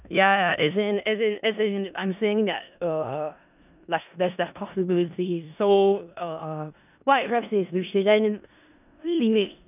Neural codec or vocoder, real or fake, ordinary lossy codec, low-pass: codec, 16 kHz in and 24 kHz out, 0.4 kbps, LongCat-Audio-Codec, four codebook decoder; fake; none; 3.6 kHz